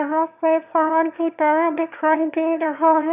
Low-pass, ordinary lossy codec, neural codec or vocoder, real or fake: 3.6 kHz; none; autoencoder, 22.05 kHz, a latent of 192 numbers a frame, VITS, trained on one speaker; fake